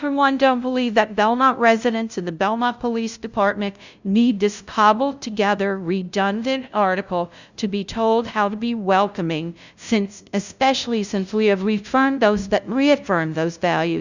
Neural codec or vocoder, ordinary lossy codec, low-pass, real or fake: codec, 16 kHz, 0.5 kbps, FunCodec, trained on LibriTTS, 25 frames a second; Opus, 64 kbps; 7.2 kHz; fake